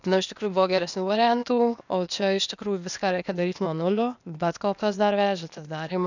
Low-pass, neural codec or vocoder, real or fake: 7.2 kHz; codec, 16 kHz, 0.8 kbps, ZipCodec; fake